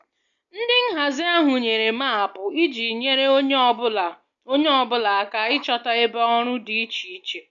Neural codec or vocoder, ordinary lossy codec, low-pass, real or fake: none; none; 7.2 kHz; real